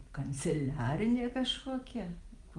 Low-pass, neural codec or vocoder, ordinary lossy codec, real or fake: 10.8 kHz; none; Opus, 24 kbps; real